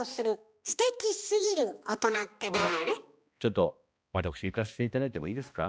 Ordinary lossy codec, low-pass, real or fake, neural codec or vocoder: none; none; fake; codec, 16 kHz, 1 kbps, X-Codec, HuBERT features, trained on balanced general audio